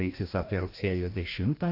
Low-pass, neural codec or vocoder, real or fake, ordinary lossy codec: 5.4 kHz; codec, 16 kHz, 1 kbps, FreqCodec, larger model; fake; AAC, 32 kbps